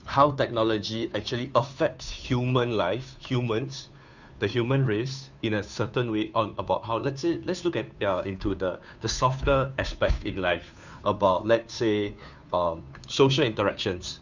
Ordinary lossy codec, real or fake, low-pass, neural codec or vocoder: none; fake; 7.2 kHz; codec, 16 kHz, 2 kbps, FunCodec, trained on Chinese and English, 25 frames a second